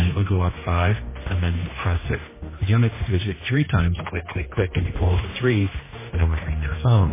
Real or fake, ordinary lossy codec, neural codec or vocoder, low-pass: fake; MP3, 16 kbps; codec, 16 kHz, 1 kbps, X-Codec, HuBERT features, trained on general audio; 3.6 kHz